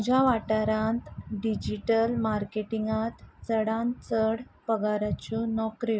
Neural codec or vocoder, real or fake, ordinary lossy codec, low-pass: none; real; none; none